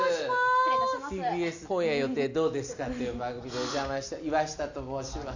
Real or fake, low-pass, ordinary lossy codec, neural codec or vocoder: real; 7.2 kHz; none; none